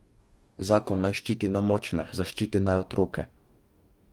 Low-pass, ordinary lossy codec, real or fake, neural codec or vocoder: 19.8 kHz; Opus, 32 kbps; fake; codec, 44.1 kHz, 2.6 kbps, DAC